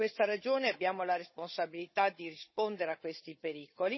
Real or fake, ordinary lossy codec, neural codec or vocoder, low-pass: fake; MP3, 24 kbps; codec, 16 kHz, 16 kbps, FunCodec, trained on Chinese and English, 50 frames a second; 7.2 kHz